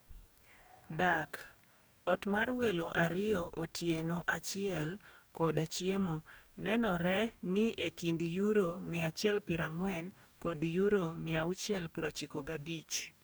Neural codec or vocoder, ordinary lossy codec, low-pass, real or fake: codec, 44.1 kHz, 2.6 kbps, DAC; none; none; fake